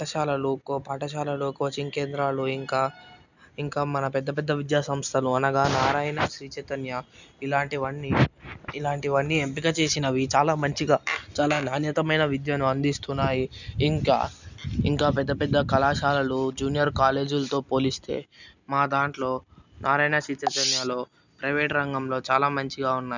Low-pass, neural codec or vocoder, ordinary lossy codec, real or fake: 7.2 kHz; none; none; real